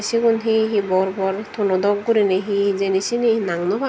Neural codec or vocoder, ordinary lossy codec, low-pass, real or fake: none; none; none; real